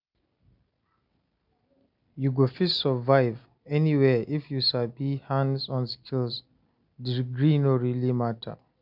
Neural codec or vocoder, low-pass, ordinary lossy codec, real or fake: none; 5.4 kHz; none; real